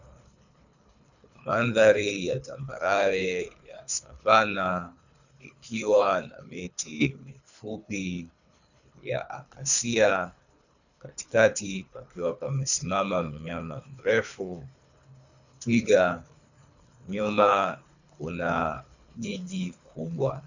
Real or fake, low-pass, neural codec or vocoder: fake; 7.2 kHz; codec, 24 kHz, 3 kbps, HILCodec